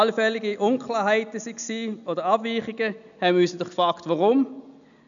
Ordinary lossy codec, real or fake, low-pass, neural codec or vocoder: none; real; 7.2 kHz; none